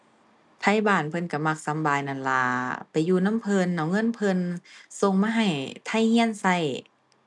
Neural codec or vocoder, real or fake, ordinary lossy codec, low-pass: none; real; none; 10.8 kHz